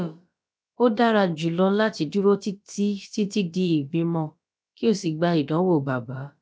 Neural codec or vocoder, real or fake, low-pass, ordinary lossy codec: codec, 16 kHz, about 1 kbps, DyCAST, with the encoder's durations; fake; none; none